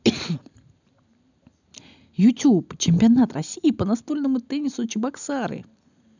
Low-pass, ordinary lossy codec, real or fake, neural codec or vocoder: 7.2 kHz; none; real; none